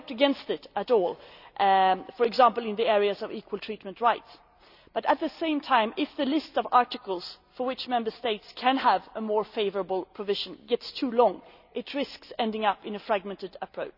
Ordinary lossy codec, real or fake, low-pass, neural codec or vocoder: none; real; 5.4 kHz; none